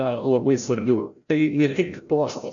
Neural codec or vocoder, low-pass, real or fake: codec, 16 kHz, 0.5 kbps, FreqCodec, larger model; 7.2 kHz; fake